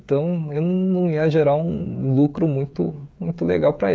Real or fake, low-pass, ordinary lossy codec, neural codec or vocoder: fake; none; none; codec, 16 kHz, 16 kbps, FreqCodec, smaller model